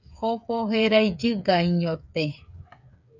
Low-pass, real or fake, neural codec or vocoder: 7.2 kHz; fake; codec, 16 kHz, 8 kbps, FreqCodec, smaller model